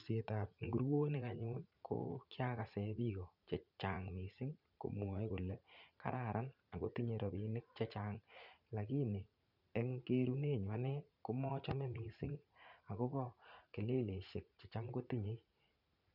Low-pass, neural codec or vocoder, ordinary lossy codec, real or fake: 5.4 kHz; vocoder, 44.1 kHz, 80 mel bands, Vocos; none; fake